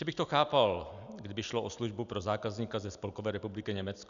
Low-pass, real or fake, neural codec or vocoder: 7.2 kHz; real; none